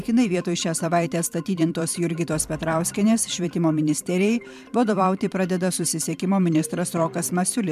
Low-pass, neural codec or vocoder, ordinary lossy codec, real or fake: 14.4 kHz; vocoder, 44.1 kHz, 128 mel bands every 512 samples, BigVGAN v2; MP3, 96 kbps; fake